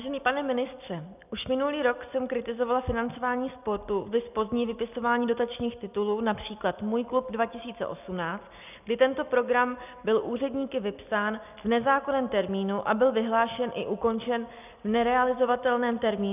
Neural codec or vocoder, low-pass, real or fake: none; 3.6 kHz; real